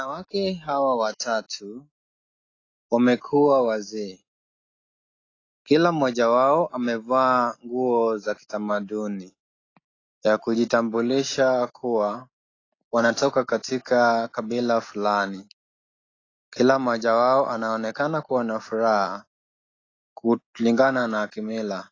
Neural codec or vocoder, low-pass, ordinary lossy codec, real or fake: none; 7.2 kHz; AAC, 32 kbps; real